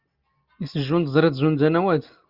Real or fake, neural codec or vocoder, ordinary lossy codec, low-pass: real; none; Opus, 32 kbps; 5.4 kHz